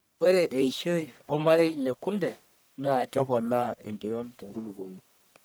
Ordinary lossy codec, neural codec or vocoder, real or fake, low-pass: none; codec, 44.1 kHz, 1.7 kbps, Pupu-Codec; fake; none